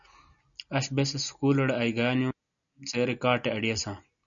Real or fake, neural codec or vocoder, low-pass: real; none; 7.2 kHz